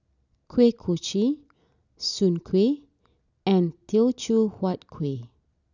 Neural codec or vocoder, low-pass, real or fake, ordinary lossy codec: none; 7.2 kHz; real; none